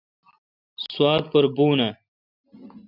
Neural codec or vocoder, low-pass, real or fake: none; 5.4 kHz; real